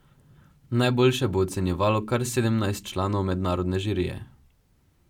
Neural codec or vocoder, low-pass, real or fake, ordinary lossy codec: none; 19.8 kHz; real; none